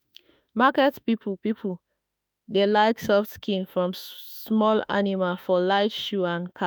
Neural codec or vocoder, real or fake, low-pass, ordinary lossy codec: autoencoder, 48 kHz, 32 numbers a frame, DAC-VAE, trained on Japanese speech; fake; none; none